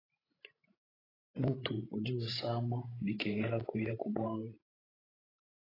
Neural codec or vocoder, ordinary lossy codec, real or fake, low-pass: codec, 16 kHz, 16 kbps, FreqCodec, larger model; AAC, 24 kbps; fake; 5.4 kHz